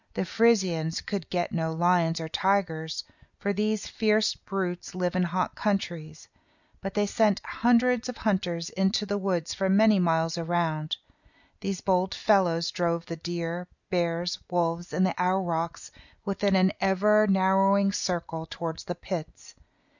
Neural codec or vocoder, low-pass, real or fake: none; 7.2 kHz; real